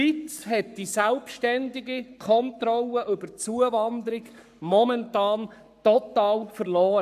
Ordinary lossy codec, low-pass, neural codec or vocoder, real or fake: none; 14.4 kHz; codec, 44.1 kHz, 7.8 kbps, Pupu-Codec; fake